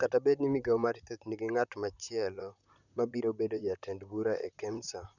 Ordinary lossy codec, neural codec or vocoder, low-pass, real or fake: none; vocoder, 44.1 kHz, 128 mel bands, Pupu-Vocoder; 7.2 kHz; fake